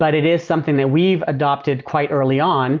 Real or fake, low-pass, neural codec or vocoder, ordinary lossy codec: real; 7.2 kHz; none; Opus, 24 kbps